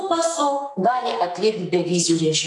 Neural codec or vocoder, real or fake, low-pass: codec, 44.1 kHz, 2.6 kbps, SNAC; fake; 10.8 kHz